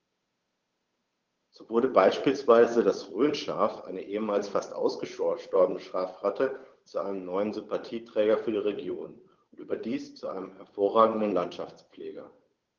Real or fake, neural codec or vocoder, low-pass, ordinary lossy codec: fake; codec, 16 kHz, 8 kbps, FunCodec, trained on Chinese and English, 25 frames a second; 7.2 kHz; Opus, 32 kbps